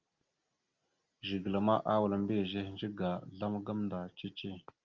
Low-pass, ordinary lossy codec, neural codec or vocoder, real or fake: 7.2 kHz; Opus, 32 kbps; none; real